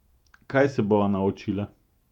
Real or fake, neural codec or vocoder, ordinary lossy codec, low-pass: fake; vocoder, 48 kHz, 128 mel bands, Vocos; none; 19.8 kHz